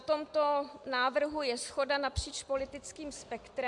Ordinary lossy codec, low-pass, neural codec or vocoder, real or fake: MP3, 64 kbps; 10.8 kHz; none; real